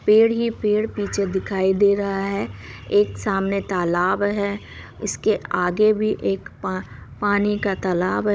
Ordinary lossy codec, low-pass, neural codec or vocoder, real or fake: none; none; codec, 16 kHz, 16 kbps, FunCodec, trained on Chinese and English, 50 frames a second; fake